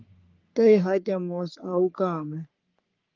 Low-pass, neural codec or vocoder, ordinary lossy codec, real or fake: 7.2 kHz; codec, 44.1 kHz, 3.4 kbps, Pupu-Codec; Opus, 24 kbps; fake